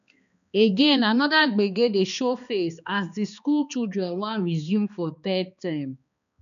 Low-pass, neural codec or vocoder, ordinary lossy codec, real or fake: 7.2 kHz; codec, 16 kHz, 2 kbps, X-Codec, HuBERT features, trained on balanced general audio; none; fake